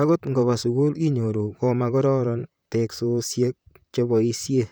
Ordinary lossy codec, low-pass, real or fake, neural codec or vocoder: none; none; fake; vocoder, 44.1 kHz, 128 mel bands, Pupu-Vocoder